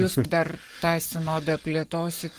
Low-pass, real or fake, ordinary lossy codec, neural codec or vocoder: 14.4 kHz; fake; Opus, 24 kbps; codec, 44.1 kHz, 7.8 kbps, Pupu-Codec